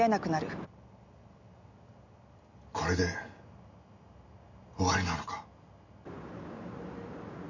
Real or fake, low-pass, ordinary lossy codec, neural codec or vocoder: real; 7.2 kHz; none; none